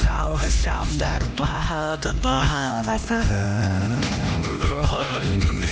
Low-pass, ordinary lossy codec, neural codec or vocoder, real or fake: none; none; codec, 16 kHz, 1 kbps, X-Codec, HuBERT features, trained on LibriSpeech; fake